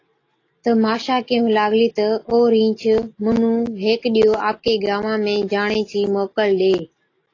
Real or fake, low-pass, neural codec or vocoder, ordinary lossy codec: real; 7.2 kHz; none; AAC, 32 kbps